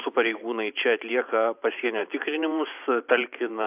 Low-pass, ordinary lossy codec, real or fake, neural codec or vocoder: 3.6 kHz; AAC, 32 kbps; fake; vocoder, 44.1 kHz, 128 mel bands every 512 samples, BigVGAN v2